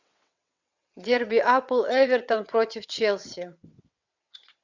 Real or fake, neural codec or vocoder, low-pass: fake; vocoder, 22.05 kHz, 80 mel bands, WaveNeXt; 7.2 kHz